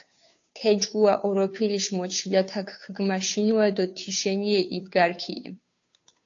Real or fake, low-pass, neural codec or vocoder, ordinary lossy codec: fake; 7.2 kHz; codec, 16 kHz, 2 kbps, FunCodec, trained on Chinese and English, 25 frames a second; AAC, 48 kbps